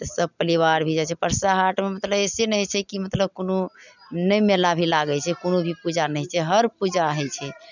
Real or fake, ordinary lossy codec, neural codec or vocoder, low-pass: real; none; none; 7.2 kHz